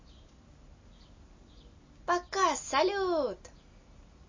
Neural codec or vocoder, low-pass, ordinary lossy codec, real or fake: none; 7.2 kHz; MP3, 32 kbps; real